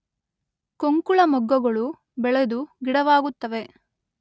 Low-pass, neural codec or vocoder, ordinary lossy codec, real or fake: none; none; none; real